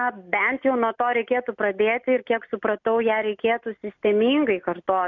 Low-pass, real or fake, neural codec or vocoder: 7.2 kHz; real; none